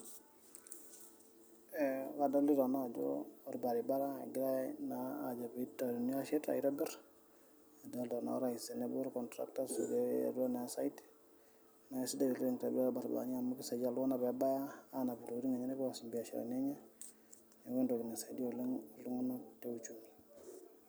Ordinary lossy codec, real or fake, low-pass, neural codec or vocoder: none; real; none; none